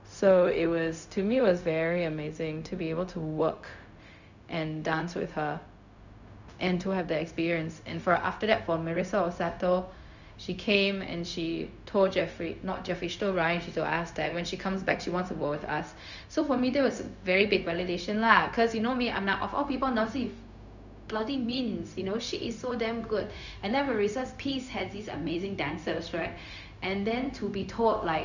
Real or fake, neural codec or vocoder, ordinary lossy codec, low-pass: fake; codec, 16 kHz, 0.4 kbps, LongCat-Audio-Codec; none; 7.2 kHz